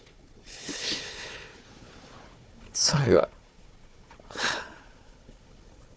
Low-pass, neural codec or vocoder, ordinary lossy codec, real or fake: none; codec, 16 kHz, 4 kbps, FunCodec, trained on Chinese and English, 50 frames a second; none; fake